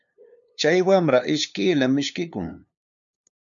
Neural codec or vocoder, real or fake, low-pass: codec, 16 kHz, 2 kbps, FunCodec, trained on LibriTTS, 25 frames a second; fake; 7.2 kHz